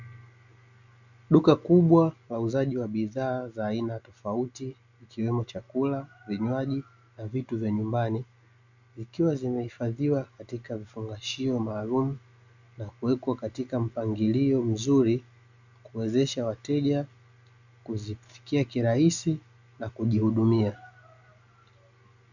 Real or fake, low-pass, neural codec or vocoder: real; 7.2 kHz; none